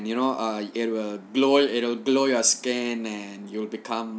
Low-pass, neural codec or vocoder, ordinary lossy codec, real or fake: none; none; none; real